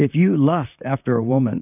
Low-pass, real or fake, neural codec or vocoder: 3.6 kHz; fake; vocoder, 44.1 kHz, 128 mel bands, Pupu-Vocoder